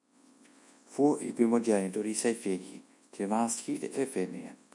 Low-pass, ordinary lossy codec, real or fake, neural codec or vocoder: 10.8 kHz; MP3, 64 kbps; fake; codec, 24 kHz, 0.9 kbps, WavTokenizer, large speech release